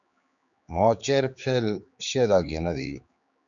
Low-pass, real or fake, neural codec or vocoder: 7.2 kHz; fake; codec, 16 kHz, 4 kbps, X-Codec, HuBERT features, trained on general audio